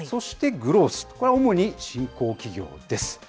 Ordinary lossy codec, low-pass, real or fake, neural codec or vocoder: none; none; real; none